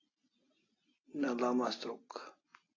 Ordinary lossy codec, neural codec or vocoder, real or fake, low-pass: AAC, 32 kbps; none; real; 7.2 kHz